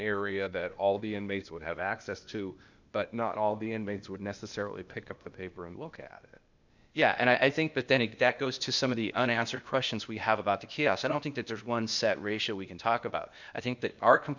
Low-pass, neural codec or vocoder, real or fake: 7.2 kHz; codec, 16 kHz, 0.8 kbps, ZipCodec; fake